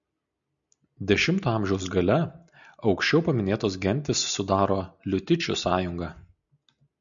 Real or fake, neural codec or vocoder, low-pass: real; none; 7.2 kHz